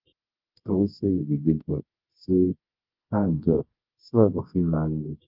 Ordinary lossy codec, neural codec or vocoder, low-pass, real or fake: Opus, 24 kbps; codec, 24 kHz, 0.9 kbps, WavTokenizer, medium music audio release; 5.4 kHz; fake